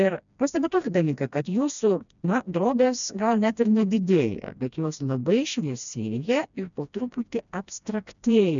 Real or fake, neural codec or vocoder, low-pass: fake; codec, 16 kHz, 1 kbps, FreqCodec, smaller model; 7.2 kHz